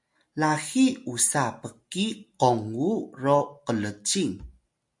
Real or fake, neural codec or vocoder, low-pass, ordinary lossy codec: real; none; 10.8 kHz; MP3, 64 kbps